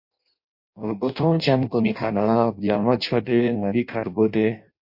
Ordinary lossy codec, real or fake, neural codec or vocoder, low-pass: MP3, 32 kbps; fake; codec, 16 kHz in and 24 kHz out, 0.6 kbps, FireRedTTS-2 codec; 5.4 kHz